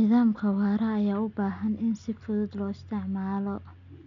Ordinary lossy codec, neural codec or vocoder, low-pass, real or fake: none; none; 7.2 kHz; real